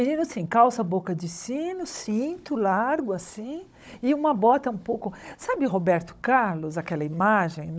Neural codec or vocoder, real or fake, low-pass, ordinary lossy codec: codec, 16 kHz, 16 kbps, FunCodec, trained on Chinese and English, 50 frames a second; fake; none; none